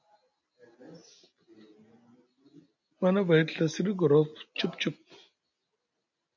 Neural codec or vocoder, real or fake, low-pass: none; real; 7.2 kHz